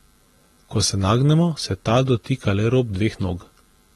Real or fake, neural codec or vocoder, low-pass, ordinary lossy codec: real; none; 19.8 kHz; AAC, 32 kbps